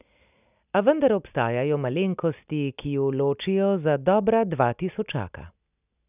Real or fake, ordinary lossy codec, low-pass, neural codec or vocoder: real; none; 3.6 kHz; none